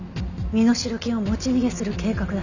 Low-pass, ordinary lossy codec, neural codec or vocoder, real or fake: 7.2 kHz; none; none; real